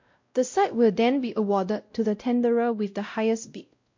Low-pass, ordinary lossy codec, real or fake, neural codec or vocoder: 7.2 kHz; MP3, 48 kbps; fake; codec, 16 kHz, 0.5 kbps, X-Codec, WavLM features, trained on Multilingual LibriSpeech